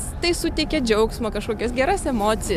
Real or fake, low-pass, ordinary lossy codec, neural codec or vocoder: real; 14.4 kHz; MP3, 96 kbps; none